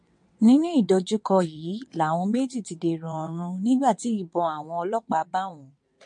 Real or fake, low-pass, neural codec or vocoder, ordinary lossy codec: fake; 9.9 kHz; vocoder, 22.05 kHz, 80 mel bands, WaveNeXt; MP3, 48 kbps